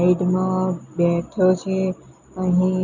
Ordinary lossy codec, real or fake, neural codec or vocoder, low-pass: none; real; none; 7.2 kHz